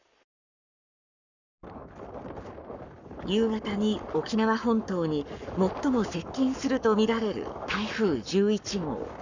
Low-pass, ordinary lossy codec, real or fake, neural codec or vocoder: 7.2 kHz; none; fake; codec, 44.1 kHz, 7.8 kbps, Pupu-Codec